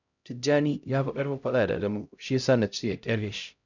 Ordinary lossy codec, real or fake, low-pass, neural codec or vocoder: none; fake; 7.2 kHz; codec, 16 kHz, 0.5 kbps, X-Codec, HuBERT features, trained on LibriSpeech